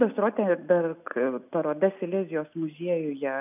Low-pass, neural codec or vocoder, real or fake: 3.6 kHz; none; real